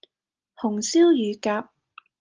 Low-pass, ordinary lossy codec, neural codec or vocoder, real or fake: 7.2 kHz; Opus, 24 kbps; none; real